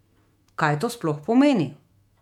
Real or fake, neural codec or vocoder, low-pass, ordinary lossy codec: fake; autoencoder, 48 kHz, 128 numbers a frame, DAC-VAE, trained on Japanese speech; 19.8 kHz; MP3, 96 kbps